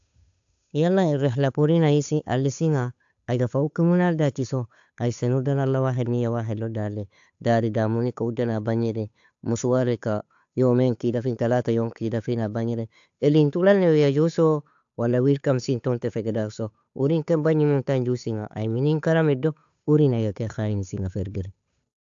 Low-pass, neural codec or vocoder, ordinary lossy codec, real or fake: 7.2 kHz; codec, 16 kHz, 8 kbps, FunCodec, trained on Chinese and English, 25 frames a second; AAC, 64 kbps; fake